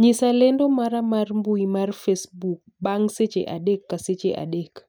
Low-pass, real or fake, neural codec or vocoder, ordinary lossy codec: none; real; none; none